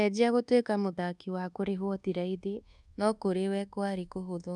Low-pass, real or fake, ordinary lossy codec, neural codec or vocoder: none; fake; none; codec, 24 kHz, 1.2 kbps, DualCodec